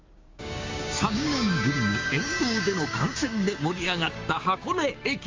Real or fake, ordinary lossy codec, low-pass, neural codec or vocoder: fake; Opus, 32 kbps; 7.2 kHz; codec, 16 kHz, 6 kbps, DAC